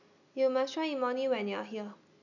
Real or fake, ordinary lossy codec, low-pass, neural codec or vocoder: real; none; 7.2 kHz; none